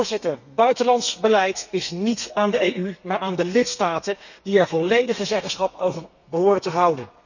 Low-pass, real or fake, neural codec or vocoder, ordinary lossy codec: 7.2 kHz; fake; codec, 32 kHz, 1.9 kbps, SNAC; none